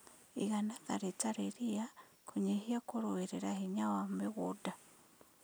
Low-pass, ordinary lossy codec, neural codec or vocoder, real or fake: none; none; none; real